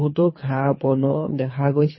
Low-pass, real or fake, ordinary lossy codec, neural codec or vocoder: 7.2 kHz; fake; MP3, 24 kbps; codec, 24 kHz, 3 kbps, HILCodec